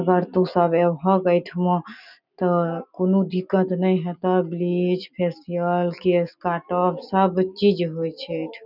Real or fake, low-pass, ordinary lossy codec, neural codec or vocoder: real; 5.4 kHz; none; none